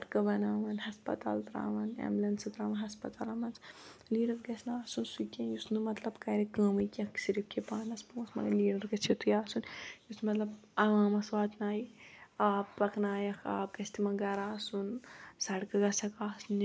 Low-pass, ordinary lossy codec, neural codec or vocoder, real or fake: none; none; none; real